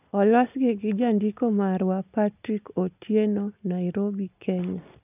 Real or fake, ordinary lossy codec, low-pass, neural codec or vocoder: real; none; 3.6 kHz; none